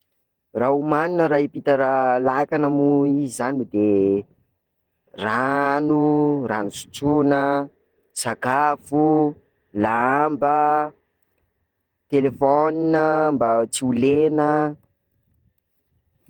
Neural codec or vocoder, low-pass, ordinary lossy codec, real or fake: vocoder, 48 kHz, 128 mel bands, Vocos; 19.8 kHz; Opus, 24 kbps; fake